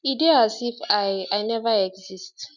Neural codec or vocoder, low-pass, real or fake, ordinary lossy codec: none; 7.2 kHz; real; none